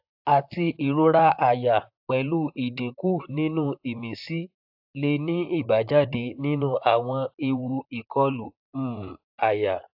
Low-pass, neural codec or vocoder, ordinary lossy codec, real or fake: 5.4 kHz; vocoder, 44.1 kHz, 128 mel bands, Pupu-Vocoder; AAC, 48 kbps; fake